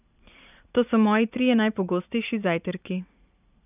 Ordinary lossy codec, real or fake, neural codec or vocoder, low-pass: none; fake; vocoder, 44.1 kHz, 80 mel bands, Vocos; 3.6 kHz